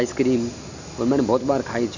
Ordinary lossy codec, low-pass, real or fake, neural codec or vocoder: none; 7.2 kHz; real; none